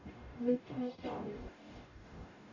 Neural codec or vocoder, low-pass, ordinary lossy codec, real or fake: codec, 44.1 kHz, 0.9 kbps, DAC; 7.2 kHz; none; fake